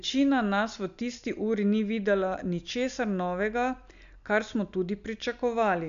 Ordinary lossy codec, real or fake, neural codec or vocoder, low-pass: none; real; none; 7.2 kHz